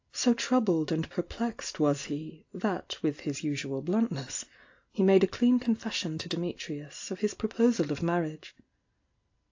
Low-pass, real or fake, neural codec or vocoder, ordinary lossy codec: 7.2 kHz; real; none; AAC, 48 kbps